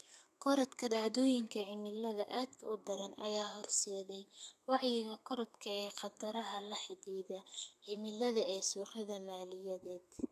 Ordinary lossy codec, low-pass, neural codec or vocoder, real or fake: none; 14.4 kHz; codec, 32 kHz, 1.9 kbps, SNAC; fake